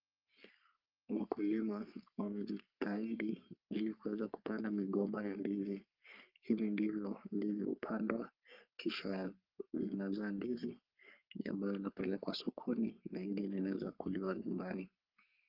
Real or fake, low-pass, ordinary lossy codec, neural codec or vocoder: fake; 5.4 kHz; Opus, 24 kbps; codec, 44.1 kHz, 3.4 kbps, Pupu-Codec